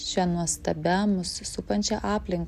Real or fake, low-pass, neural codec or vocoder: real; 14.4 kHz; none